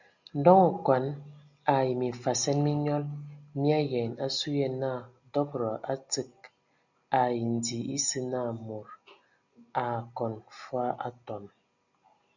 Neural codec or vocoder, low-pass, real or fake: none; 7.2 kHz; real